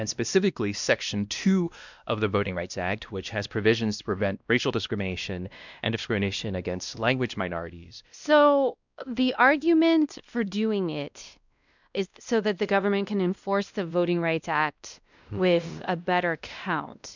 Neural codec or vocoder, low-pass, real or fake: codec, 16 kHz, 1 kbps, X-Codec, WavLM features, trained on Multilingual LibriSpeech; 7.2 kHz; fake